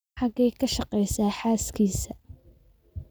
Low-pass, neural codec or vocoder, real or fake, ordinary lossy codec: none; none; real; none